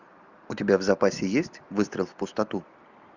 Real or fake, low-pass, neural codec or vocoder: real; 7.2 kHz; none